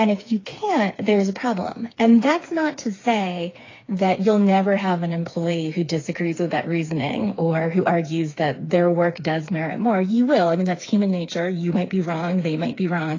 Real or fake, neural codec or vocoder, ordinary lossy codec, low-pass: fake; codec, 16 kHz, 4 kbps, FreqCodec, smaller model; AAC, 32 kbps; 7.2 kHz